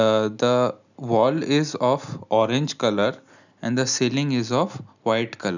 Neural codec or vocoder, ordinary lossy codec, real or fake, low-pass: none; none; real; 7.2 kHz